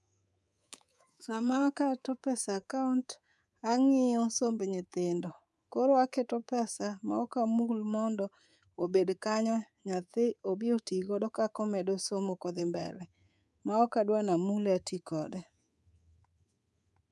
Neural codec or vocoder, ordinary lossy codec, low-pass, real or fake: codec, 24 kHz, 3.1 kbps, DualCodec; none; none; fake